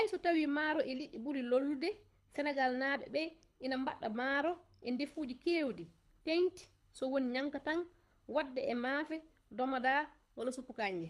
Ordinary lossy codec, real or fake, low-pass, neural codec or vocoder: none; fake; none; codec, 24 kHz, 6 kbps, HILCodec